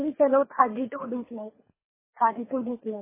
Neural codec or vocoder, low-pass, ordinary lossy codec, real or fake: codec, 24 kHz, 3 kbps, HILCodec; 3.6 kHz; MP3, 16 kbps; fake